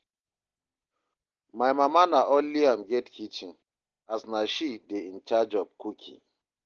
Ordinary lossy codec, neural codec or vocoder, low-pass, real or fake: Opus, 16 kbps; none; 7.2 kHz; real